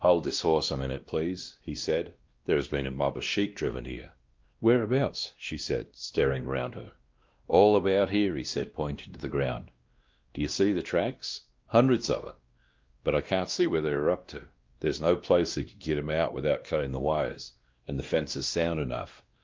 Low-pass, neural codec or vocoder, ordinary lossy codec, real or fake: 7.2 kHz; codec, 16 kHz, 1 kbps, X-Codec, WavLM features, trained on Multilingual LibriSpeech; Opus, 32 kbps; fake